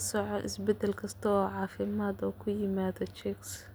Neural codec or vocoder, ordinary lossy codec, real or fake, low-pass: none; none; real; none